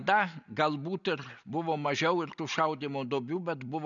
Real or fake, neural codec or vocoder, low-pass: real; none; 7.2 kHz